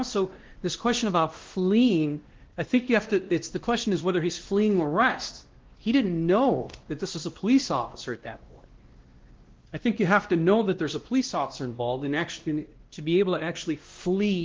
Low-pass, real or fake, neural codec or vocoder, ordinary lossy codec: 7.2 kHz; fake; codec, 16 kHz, 1 kbps, X-Codec, WavLM features, trained on Multilingual LibriSpeech; Opus, 16 kbps